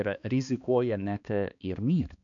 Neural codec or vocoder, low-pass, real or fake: codec, 16 kHz, 2 kbps, X-Codec, HuBERT features, trained on balanced general audio; 7.2 kHz; fake